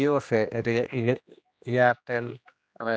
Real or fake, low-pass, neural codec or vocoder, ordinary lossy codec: fake; none; codec, 16 kHz, 1 kbps, X-Codec, HuBERT features, trained on general audio; none